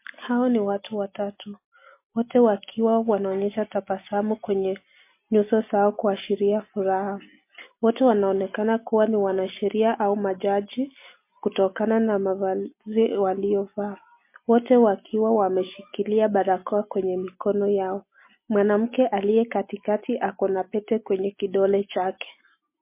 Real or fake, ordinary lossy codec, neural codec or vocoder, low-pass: real; MP3, 24 kbps; none; 3.6 kHz